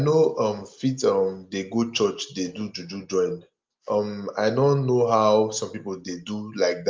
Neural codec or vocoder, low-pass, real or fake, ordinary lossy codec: none; 7.2 kHz; real; Opus, 24 kbps